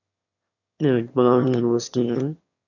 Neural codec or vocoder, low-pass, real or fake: autoencoder, 22.05 kHz, a latent of 192 numbers a frame, VITS, trained on one speaker; 7.2 kHz; fake